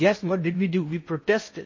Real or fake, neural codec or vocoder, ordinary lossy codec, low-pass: fake; codec, 16 kHz in and 24 kHz out, 0.8 kbps, FocalCodec, streaming, 65536 codes; MP3, 32 kbps; 7.2 kHz